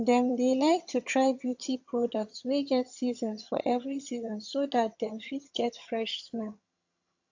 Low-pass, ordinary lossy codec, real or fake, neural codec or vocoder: 7.2 kHz; AAC, 48 kbps; fake; vocoder, 22.05 kHz, 80 mel bands, HiFi-GAN